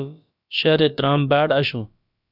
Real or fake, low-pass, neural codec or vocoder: fake; 5.4 kHz; codec, 16 kHz, about 1 kbps, DyCAST, with the encoder's durations